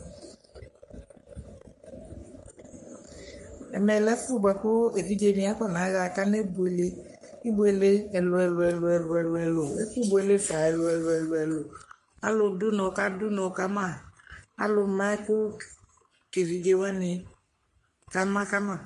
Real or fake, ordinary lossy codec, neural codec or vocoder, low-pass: fake; MP3, 48 kbps; codec, 44.1 kHz, 3.4 kbps, Pupu-Codec; 14.4 kHz